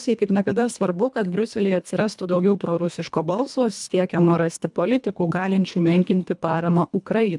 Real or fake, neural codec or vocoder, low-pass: fake; codec, 24 kHz, 1.5 kbps, HILCodec; 10.8 kHz